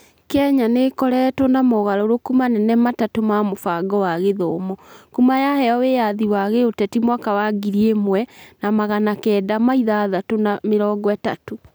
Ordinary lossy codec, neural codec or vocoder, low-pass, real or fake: none; none; none; real